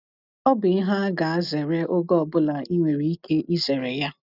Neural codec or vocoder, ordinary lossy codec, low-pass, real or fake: none; none; 5.4 kHz; real